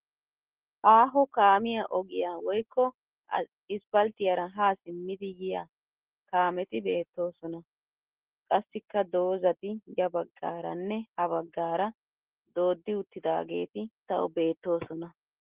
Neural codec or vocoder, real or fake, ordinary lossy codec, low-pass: none; real; Opus, 16 kbps; 3.6 kHz